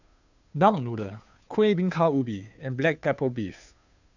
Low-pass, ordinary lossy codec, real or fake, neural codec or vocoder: 7.2 kHz; none; fake; codec, 16 kHz, 2 kbps, FunCodec, trained on Chinese and English, 25 frames a second